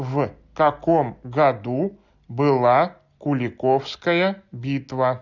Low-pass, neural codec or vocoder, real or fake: 7.2 kHz; none; real